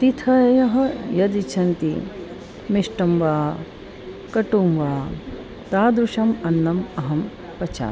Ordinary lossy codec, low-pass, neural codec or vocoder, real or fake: none; none; none; real